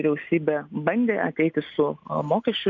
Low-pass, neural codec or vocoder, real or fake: 7.2 kHz; none; real